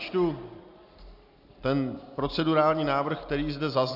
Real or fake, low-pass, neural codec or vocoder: real; 5.4 kHz; none